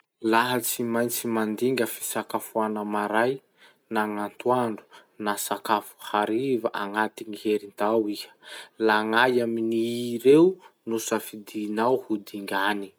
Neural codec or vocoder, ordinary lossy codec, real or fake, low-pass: none; none; real; none